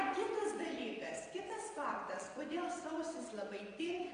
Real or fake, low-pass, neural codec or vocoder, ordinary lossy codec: fake; 9.9 kHz; vocoder, 22.05 kHz, 80 mel bands, WaveNeXt; MP3, 64 kbps